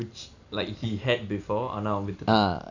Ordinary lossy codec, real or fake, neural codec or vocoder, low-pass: none; real; none; 7.2 kHz